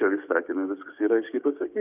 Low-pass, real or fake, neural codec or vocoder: 3.6 kHz; fake; codec, 16 kHz, 6 kbps, DAC